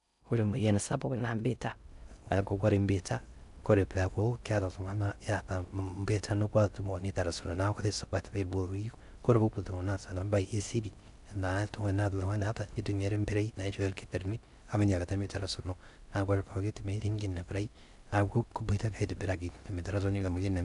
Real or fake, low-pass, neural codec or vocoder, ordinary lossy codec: fake; 10.8 kHz; codec, 16 kHz in and 24 kHz out, 0.6 kbps, FocalCodec, streaming, 2048 codes; none